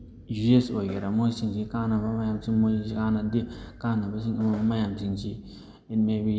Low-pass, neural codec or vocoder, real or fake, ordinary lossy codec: none; none; real; none